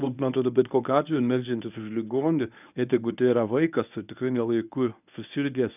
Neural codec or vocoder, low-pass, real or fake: codec, 24 kHz, 0.9 kbps, WavTokenizer, medium speech release version 1; 3.6 kHz; fake